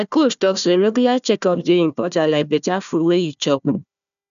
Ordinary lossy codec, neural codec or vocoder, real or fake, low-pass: none; codec, 16 kHz, 1 kbps, FunCodec, trained on Chinese and English, 50 frames a second; fake; 7.2 kHz